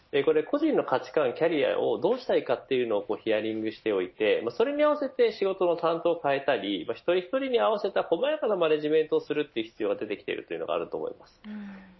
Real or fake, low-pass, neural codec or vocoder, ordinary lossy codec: real; 7.2 kHz; none; MP3, 24 kbps